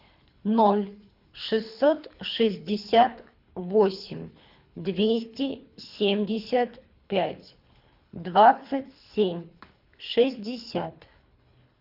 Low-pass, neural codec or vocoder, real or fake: 5.4 kHz; codec, 24 kHz, 3 kbps, HILCodec; fake